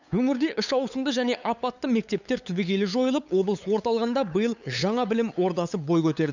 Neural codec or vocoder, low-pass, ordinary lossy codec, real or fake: codec, 16 kHz, 8 kbps, FunCodec, trained on LibriTTS, 25 frames a second; 7.2 kHz; none; fake